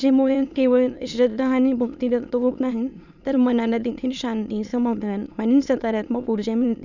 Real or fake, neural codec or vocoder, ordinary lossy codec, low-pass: fake; autoencoder, 22.05 kHz, a latent of 192 numbers a frame, VITS, trained on many speakers; none; 7.2 kHz